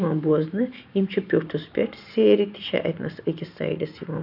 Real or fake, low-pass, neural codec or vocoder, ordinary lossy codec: fake; 5.4 kHz; vocoder, 44.1 kHz, 128 mel bands every 512 samples, BigVGAN v2; none